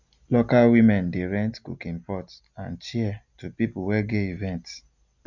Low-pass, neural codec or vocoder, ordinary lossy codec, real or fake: 7.2 kHz; none; none; real